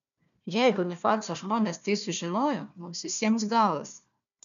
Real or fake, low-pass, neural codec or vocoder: fake; 7.2 kHz; codec, 16 kHz, 1 kbps, FunCodec, trained on Chinese and English, 50 frames a second